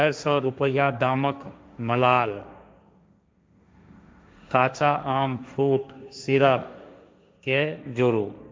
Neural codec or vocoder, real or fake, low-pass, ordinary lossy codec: codec, 16 kHz, 1.1 kbps, Voila-Tokenizer; fake; none; none